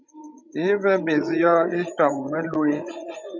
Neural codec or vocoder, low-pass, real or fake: codec, 16 kHz, 16 kbps, FreqCodec, larger model; 7.2 kHz; fake